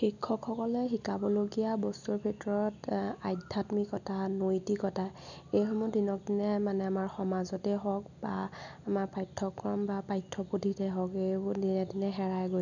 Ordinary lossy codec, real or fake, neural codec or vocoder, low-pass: none; real; none; 7.2 kHz